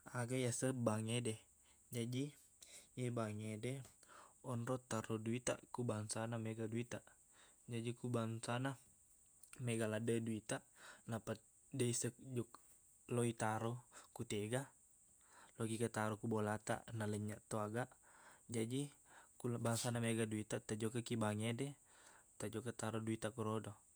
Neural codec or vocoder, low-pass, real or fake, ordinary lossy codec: vocoder, 48 kHz, 128 mel bands, Vocos; none; fake; none